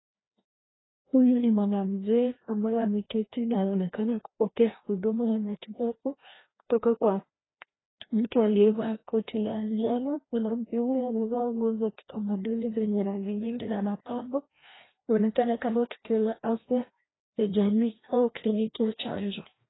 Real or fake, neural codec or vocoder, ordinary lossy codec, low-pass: fake; codec, 16 kHz, 1 kbps, FreqCodec, larger model; AAC, 16 kbps; 7.2 kHz